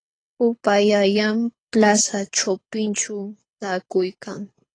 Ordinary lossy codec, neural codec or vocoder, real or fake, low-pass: AAC, 32 kbps; codec, 24 kHz, 6 kbps, HILCodec; fake; 9.9 kHz